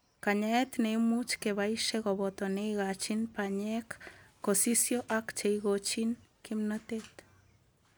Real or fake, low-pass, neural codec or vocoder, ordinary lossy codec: real; none; none; none